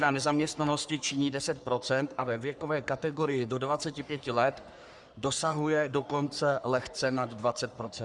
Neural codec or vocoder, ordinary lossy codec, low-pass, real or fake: codec, 44.1 kHz, 3.4 kbps, Pupu-Codec; Opus, 64 kbps; 10.8 kHz; fake